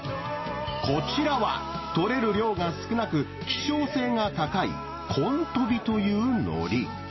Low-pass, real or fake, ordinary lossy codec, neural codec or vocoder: 7.2 kHz; real; MP3, 24 kbps; none